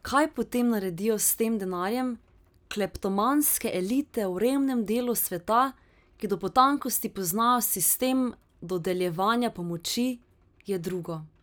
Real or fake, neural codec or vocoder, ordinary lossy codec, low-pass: real; none; none; none